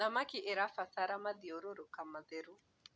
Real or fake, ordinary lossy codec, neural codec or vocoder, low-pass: real; none; none; none